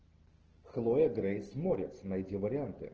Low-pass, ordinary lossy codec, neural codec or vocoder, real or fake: 7.2 kHz; Opus, 16 kbps; none; real